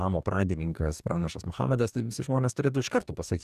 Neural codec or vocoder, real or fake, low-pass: codec, 44.1 kHz, 2.6 kbps, DAC; fake; 14.4 kHz